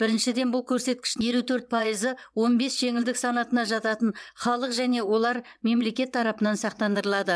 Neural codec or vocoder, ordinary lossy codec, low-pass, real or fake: vocoder, 22.05 kHz, 80 mel bands, WaveNeXt; none; none; fake